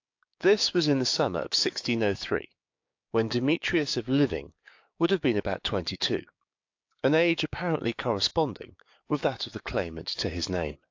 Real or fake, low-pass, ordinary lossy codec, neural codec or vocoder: fake; 7.2 kHz; AAC, 48 kbps; codec, 16 kHz, 6 kbps, DAC